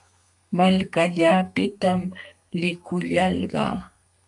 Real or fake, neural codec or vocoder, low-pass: fake; codec, 32 kHz, 1.9 kbps, SNAC; 10.8 kHz